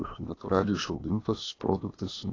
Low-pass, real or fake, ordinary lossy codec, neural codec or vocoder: 7.2 kHz; fake; AAC, 32 kbps; codec, 16 kHz, 0.8 kbps, ZipCodec